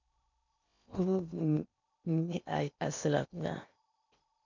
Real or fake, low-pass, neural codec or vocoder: fake; 7.2 kHz; codec, 16 kHz in and 24 kHz out, 0.8 kbps, FocalCodec, streaming, 65536 codes